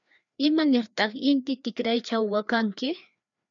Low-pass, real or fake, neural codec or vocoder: 7.2 kHz; fake; codec, 16 kHz, 2 kbps, FreqCodec, larger model